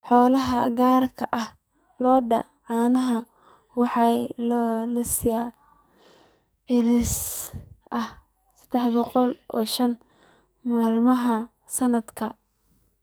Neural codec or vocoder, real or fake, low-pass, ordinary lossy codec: codec, 44.1 kHz, 2.6 kbps, SNAC; fake; none; none